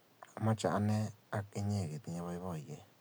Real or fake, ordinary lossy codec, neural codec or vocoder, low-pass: real; none; none; none